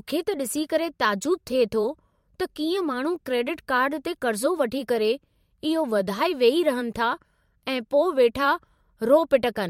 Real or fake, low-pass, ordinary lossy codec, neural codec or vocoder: fake; 19.8 kHz; MP3, 64 kbps; vocoder, 44.1 kHz, 128 mel bands every 512 samples, BigVGAN v2